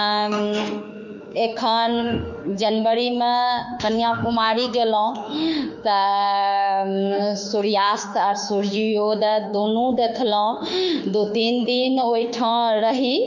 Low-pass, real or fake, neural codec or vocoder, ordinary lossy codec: 7.2 kHz; fake; autoencoder, 48 kHz, 32 numbers a frame, DAC-VAE, trained on Japanese speech; none